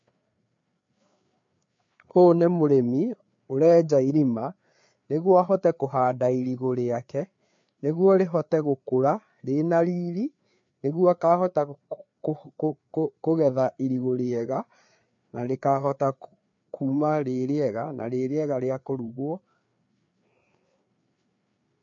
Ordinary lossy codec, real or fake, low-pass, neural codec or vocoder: MP3, 48 kbps; fake; 7.2 kHz; codec, 16 kHz, 4 kbps, FreqCodec, larger model